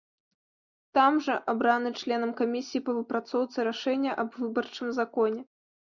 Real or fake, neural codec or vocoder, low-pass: real; none; 7.2 kHz